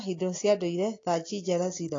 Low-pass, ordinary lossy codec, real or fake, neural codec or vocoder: 7.2 kHz; AAC, 32 kbps; real; none